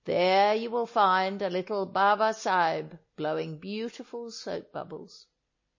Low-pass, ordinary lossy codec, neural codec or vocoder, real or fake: 7.2 kHz; MP3, 32 kbps; none; real